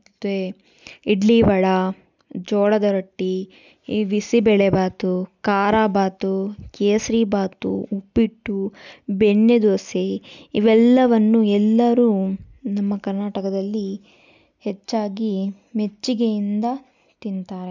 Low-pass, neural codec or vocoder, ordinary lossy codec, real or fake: 7.2 kHz; none; none; real